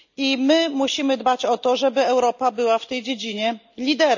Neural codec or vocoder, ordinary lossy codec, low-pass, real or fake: none; none; 7.2 kHz; real